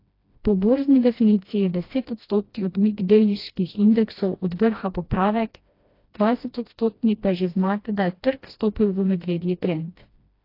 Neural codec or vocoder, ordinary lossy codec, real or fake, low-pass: codec, 16 kHz, 1 kbps, FreqCodec, smaller model; AAC, 32 kbps; fake; 5.4 kHz